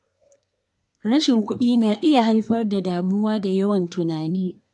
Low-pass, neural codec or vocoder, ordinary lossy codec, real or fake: 10.8 kHz; codec, 24 kHz, 1 kbps, SNAC; AAC, 64 kbps; fake